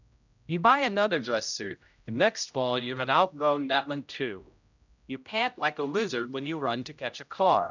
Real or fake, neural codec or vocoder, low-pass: fake; codec, 16 kHz, 0.5 kbps, X-Codec, HuBERT features, trained on general audio; 7.2 kHz